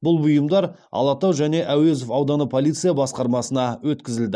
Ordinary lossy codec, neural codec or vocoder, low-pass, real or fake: none; none; none; real